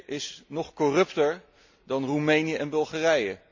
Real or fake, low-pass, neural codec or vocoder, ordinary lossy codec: real; 7.2 kHz; none; MP3, 64 kbps